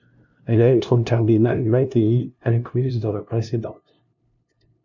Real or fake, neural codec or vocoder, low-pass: fake; codec, 16 kHz, 0.5 kbps, FunCodec, trained on LibriTTS, 25 frames a second; 7.2 kHz